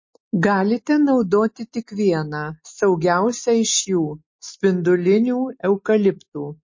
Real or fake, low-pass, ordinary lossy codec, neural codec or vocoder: real; 7.2 kHz; MP3, 32 kbps; none